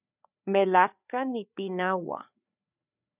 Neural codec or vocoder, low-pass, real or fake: codec, 16 kHz, 4 kbps, FreqCodec, larger model; 3.6 kHz; fake